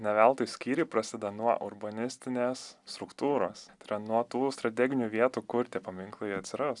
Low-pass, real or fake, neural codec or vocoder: 10.8 kHz; real; none